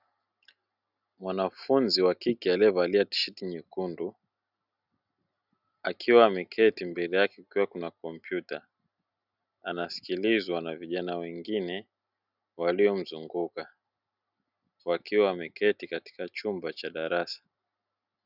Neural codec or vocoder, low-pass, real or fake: none; 5.4 kHz; real